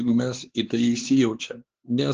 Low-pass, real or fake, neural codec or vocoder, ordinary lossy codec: 7.2 kHz; fake; codec, 16 kHz, 4 kbps, X-Codec, HuBERT features, trained on LibriSpeech; Opus, 16 kbps